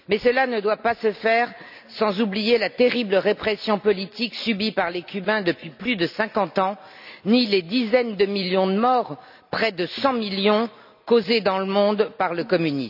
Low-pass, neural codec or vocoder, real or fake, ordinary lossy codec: 5.4 kHz; none; real; none